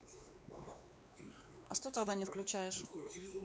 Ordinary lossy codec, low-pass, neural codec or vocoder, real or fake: none; none; codec, 16 kHz, 2 kbps, X-Codec, WavLM features, trained on Multilingual LibriSpeech; fake